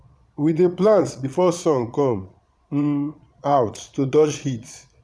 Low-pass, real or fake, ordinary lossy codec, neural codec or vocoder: none; fake; none; vocoder, 22.05 kHz, 80 mel bands, Vocos